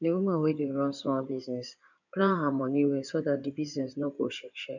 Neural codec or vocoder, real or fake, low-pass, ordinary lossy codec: codec, 16 kHz in and 24 kHz out, 2.2 kbps, FireRedTTS-2 codec; fake; 7.2 kHz; none